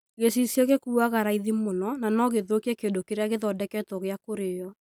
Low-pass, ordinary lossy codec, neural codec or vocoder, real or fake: none; none; none; real